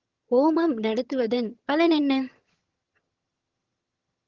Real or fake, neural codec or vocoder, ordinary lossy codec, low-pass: fake; vocoder, 22.05 kHz, 80 mel bands, HiFi-GAN; Opus, 16 kbps; 7.2 kHz